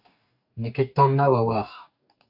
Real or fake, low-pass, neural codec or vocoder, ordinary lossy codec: fake; 5.4 kHz; codec, 44.1 kHz, 2.6 kbps, DAC; AAC, 48 kbps